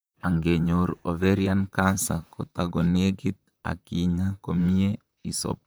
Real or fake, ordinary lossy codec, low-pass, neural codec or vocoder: fake; none; none; vocoder, 44.1 kHz, 128 mel bands, Pupu-Vocoder